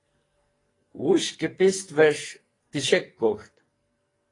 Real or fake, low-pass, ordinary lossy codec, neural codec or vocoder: fake; 10.8 kHz; AAC, 32 kbps; codec, 44.1 kHz, 2.6 kbps, SNAC